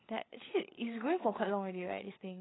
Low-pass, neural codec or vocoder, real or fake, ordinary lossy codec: 7.2 kHz; codec, 16 kHz, 8 kbps, FreqCodec, larger model; fake; AAC, 16 kbps